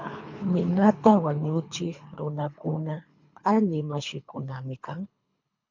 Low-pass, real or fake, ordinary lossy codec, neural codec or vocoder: 7.2 kHz; fake; MP3, 64 kbps; codec, 24 kHz, 3 kbps, HILCodec